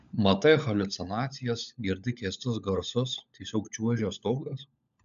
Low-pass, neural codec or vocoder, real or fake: 7.2 kHz; codec, 16 kHz, 16 kbps, FunCodec, trained on LibriTTS, 50 frames a second; fake